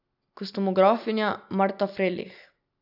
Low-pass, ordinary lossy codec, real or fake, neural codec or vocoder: 5.4 kHz; none; real; none